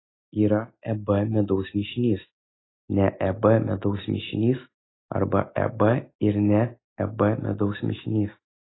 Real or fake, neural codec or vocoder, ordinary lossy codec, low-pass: real; none; AAC, 16 kbps; 7.2 kHz